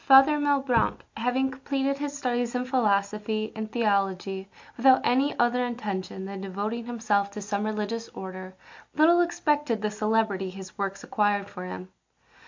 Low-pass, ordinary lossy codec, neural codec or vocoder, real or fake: 7.2 kHz; MP3, 48 kbps; none; real